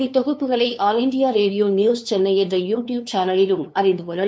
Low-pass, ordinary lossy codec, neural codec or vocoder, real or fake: none; none; codec, 16 kHz, 2 kbps, FunCodec, trained on LibriTTS, 25 frames a second; fake